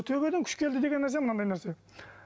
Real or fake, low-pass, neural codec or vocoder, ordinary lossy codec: real; none; none; none